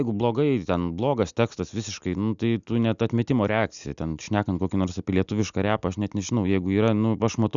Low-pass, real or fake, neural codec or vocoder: 7.2 kHz; real; none